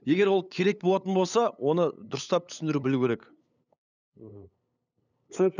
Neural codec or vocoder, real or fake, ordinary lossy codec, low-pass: codec, 16 kHz, 8 kbps, FunCodec, trained on LibriTTS, 25 frames a second; fake; none; 7.2 kHz